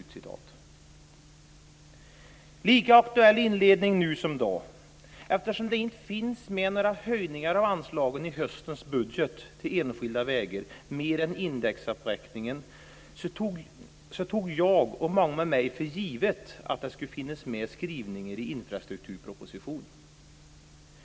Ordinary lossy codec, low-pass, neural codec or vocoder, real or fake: none; none; none; real